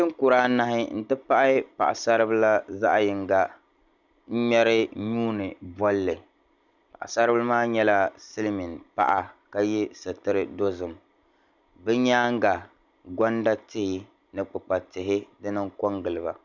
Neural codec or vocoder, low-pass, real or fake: none; 7.2 kHz; real